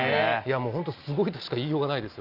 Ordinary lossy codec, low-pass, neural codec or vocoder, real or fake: Opus, 32 kbps; 5.4 kHz; none; real